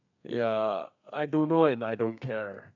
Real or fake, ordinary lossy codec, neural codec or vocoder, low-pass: fake; none; codec, 32 kHz, 1.9 kbps, SNAC; 7.2 kHz